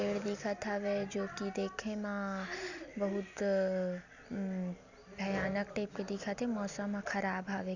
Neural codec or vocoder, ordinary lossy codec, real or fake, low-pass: none; none; real; 7.2 kHz